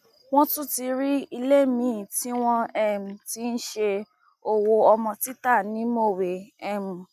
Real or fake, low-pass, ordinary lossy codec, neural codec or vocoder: real; 14.4 kHz; none; none